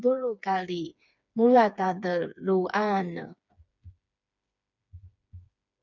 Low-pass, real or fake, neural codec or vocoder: 7.2 kHz; fake; codec, 16 kHz, 4 kbps, FreqCodec, smaller model